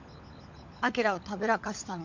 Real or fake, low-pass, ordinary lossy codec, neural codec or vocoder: fake; 7.2 kHz; MP3, 64 kbps; codec, 16 kHz, 16 kbps, FunCodec, trained on LibriTTS, 50 frames a second